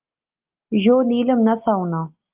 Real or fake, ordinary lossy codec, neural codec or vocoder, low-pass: real; Opus, 32 kbps; none; 3.6 kHz